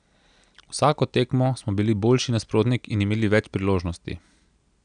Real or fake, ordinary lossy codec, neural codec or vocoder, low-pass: real; none; none; 9.9 kHz